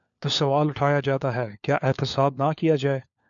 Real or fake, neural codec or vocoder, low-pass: fake; codec, 16 kHz, 4 kbps, FunCodec, trained on LibriTTS, 50 frames a second; 7.2 kHz